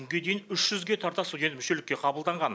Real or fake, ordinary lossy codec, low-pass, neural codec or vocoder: real; none; none; none